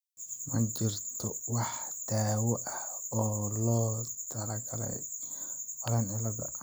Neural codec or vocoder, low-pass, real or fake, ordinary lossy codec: none; none; real; none